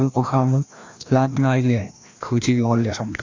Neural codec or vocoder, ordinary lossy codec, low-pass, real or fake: codec, 16 kHz, 1 kbps, FreqCodec, larger model; AAC, 48 kbps; 7.2 kHz; fake